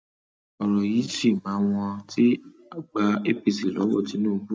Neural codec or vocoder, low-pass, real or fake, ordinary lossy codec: none; none; real; none